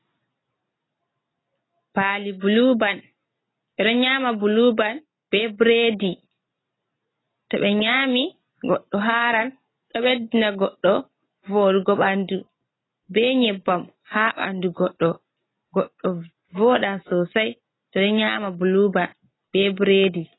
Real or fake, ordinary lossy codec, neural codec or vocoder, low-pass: real; AAC, 16 kbps; none; 7.2 kHz